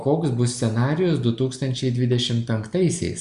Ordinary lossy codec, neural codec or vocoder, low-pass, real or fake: Opus, 64 kbps; none; 10.8 kHz; real